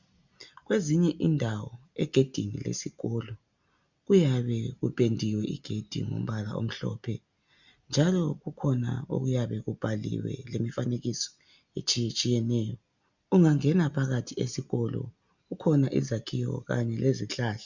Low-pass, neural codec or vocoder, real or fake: 7.2 kHz; none; real